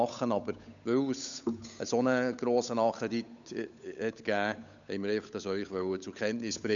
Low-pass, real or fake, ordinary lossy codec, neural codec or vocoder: 7.2 kHz; fake; none; codec, 16 kHz, 8 kbps, FunCodec, trained on Chinese and English, 25 frames a second